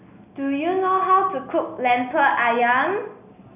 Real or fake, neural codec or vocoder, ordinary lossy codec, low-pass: real; none; none; 3.6 kHz